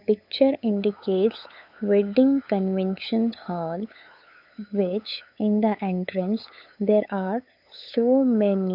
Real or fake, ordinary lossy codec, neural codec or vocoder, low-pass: fake; AAC, 48 kbps; codec, 16 kHz, 8 kbps, FunCodec, trained on LibriTTS, 25 frames a second; 5.4 kHz